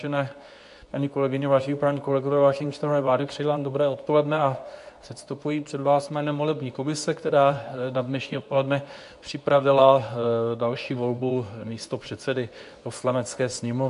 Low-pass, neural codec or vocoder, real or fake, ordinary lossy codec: 10.8 kHz; codec, 24 kHz, 0.9 kbps, WavTokenizer, medium speech release version 2; fake; AAC, 64 kbps